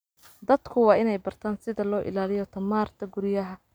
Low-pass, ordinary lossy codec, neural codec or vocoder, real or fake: none; none; none; real